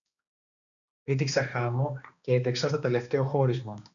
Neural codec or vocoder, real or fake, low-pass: codec, 16 kHz, 2 kbps, X-Codec, HuBERT features, trained on balanced general audio; fake; 7.2 kHz